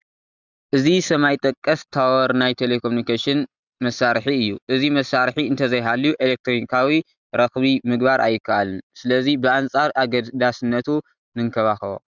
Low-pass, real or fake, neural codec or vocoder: 7.2 kHz; real; none